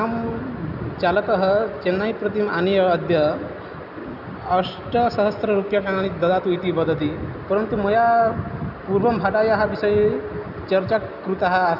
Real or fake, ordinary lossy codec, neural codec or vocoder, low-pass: real; none; none; 5.4 kHz